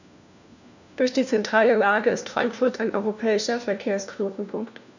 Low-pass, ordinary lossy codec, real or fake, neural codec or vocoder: 7.2 kHz; none; fake; codec, 16 kHz, 1 kbps, FunCodec, trained on LibriTTS, 50 frames a second